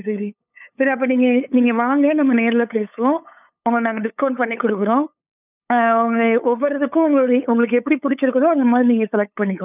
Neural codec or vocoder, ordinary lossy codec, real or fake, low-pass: codec, 16 kHz, 8 kbps, FunCodec, trained on LibriTTS, 25 frames a second; none; fake; 3.6 kHz